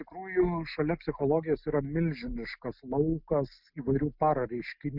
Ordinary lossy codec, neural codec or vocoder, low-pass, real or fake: Opus, 64 kbps; none; 5.4 kHz; real